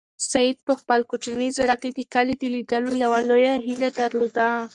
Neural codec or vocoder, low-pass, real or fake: codec, 44.1 kHz, 3.4 kbps, Pupu-Codec; 10.8 kHz; fake